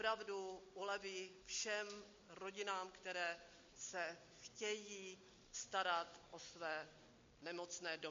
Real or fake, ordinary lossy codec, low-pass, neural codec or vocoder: real; MP3, 48 kbps; 7.2 kHz; none